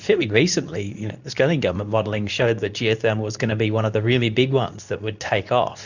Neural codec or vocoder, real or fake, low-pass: codec, 24 kHz, 0.9 kbps, WavTokenizer, medium speech release version 2; fake; 7.2 kHz